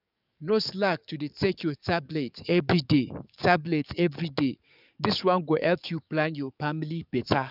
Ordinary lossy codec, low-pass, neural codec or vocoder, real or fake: none; 5.4 kHz; codec, 44.1 kHz, 7.8 kbps, DAC; fake